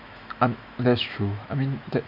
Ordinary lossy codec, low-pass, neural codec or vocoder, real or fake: none; 5.4 kHz; autoencoder, 48 kHz, 128 numbers a frame, DAC-VAE, trained on Japanese speech; fake